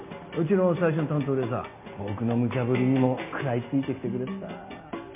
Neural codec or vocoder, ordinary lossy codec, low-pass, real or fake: none; MP3, 32 kbps; 3.6 kHz; real